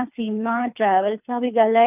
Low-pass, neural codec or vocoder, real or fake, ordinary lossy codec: 3.6 kHz; codec, 24 kHz, 3 kbps, HILCodec; fake; none